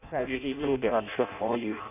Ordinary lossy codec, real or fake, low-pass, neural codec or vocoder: none; fake; 3.6 kHz; codec, 16 kHz in and 24 kHz out, 0.6 kbps, FireRedTTS-2 codec